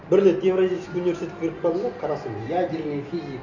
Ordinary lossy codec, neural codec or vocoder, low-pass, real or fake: none; none; 7.2 kHz; real